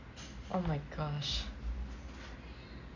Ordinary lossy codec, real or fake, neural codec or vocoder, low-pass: none; real; none; 7.2 kHz